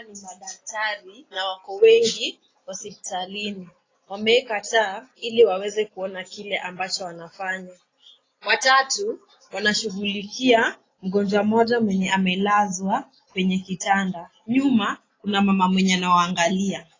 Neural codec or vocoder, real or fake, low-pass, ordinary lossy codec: none; real; 7.2 kHz; AAC, 32 kbps